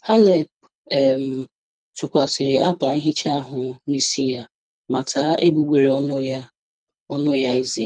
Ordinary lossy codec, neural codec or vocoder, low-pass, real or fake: none; codec, 24 kHz, 3 kbps, HILCodec; 9.9 kHz; fake